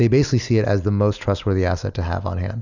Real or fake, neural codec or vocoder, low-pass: real; none; 7.2 kHz